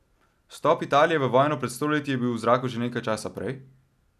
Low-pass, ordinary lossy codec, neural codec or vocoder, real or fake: 14.4 kHz; none; none; real